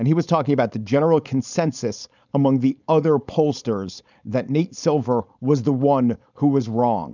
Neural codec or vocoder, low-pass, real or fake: codec, 16 kHz, 4.8 kbps, FACodec; 7.2 kHz; fake